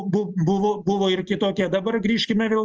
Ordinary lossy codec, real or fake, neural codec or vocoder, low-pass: Opus, 24 kbps; real; none; 7.2 kHz